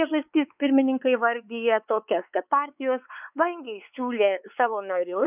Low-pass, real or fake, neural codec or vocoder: 3.6 kHz; fake; codec, 16 kHz, 4 kbps, X-Codec, WavLM features, trained on Multilingual LibriSpeech